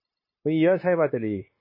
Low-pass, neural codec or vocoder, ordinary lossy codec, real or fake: 5.4 kHz; codec, 16 kHz, 0.9 kbps, LongCat-Audio-Codec; MP3, 24 kbps; fake